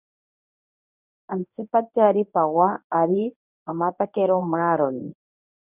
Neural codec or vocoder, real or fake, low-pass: codec, 24 kHz, 0.9 kbps, WavTokenizer, medium speech release version 1; fake; 3.6 kHz